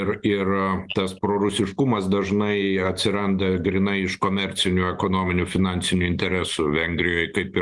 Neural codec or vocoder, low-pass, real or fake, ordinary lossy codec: none; 10.8 kHz; real; Opus, 24 kbps